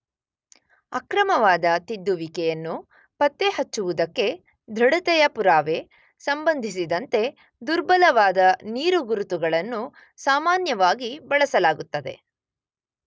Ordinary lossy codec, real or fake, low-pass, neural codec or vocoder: none; real; none; none